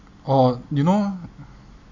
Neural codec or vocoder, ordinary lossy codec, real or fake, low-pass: none; none; real; 7.2 kHz